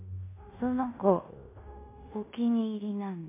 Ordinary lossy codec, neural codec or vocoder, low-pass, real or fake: MP3, 24 kbps; codec, 16 kHz in and 24 kHz out, 0.9 kbps, LongCat-Audio-Codec, fine tuned four codebook decoder; 3.6 kHz; fake